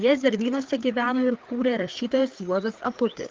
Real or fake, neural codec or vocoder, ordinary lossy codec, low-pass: fake; codec, 16 kHz, 4 kbps, FreqCodec, larger model; Opus, 32 kbps; 7.2 kHz